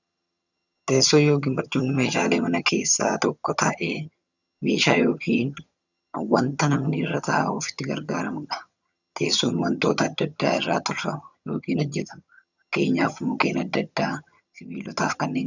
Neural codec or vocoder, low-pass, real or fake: vocoder, 22.05 kHz, 80 mel bands, HiFi-GAN; 7.2 kHz; fake